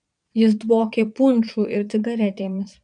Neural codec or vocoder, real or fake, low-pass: vocoder, 22.05 kHz, 80 mel bands, Vocos; fake; 9.9 kHz